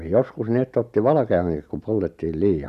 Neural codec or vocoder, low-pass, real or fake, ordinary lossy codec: none; 14.4 kHz; real; MP3, 96 kbps